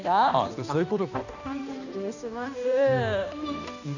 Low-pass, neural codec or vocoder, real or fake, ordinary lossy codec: 7.2 kHz; codec, 16 kHz, 1 kbps, X-Codec, HuBERT features, trained on balanced general audio; fake; none